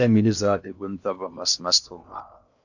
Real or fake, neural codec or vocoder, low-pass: fake; codec, 16 kHz in and 24 kHz out, 0.8 kbps, FocalCodec, streaming, 65536 codes; 7.2 kHz